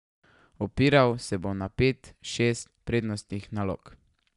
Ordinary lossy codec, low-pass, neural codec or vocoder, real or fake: none; 10.8 kHz; none; real